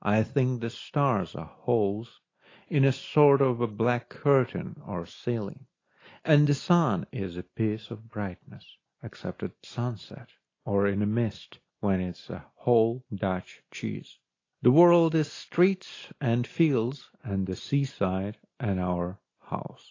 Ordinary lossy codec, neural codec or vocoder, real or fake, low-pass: AAC, 32 kbps; none; real; 7.2 kHz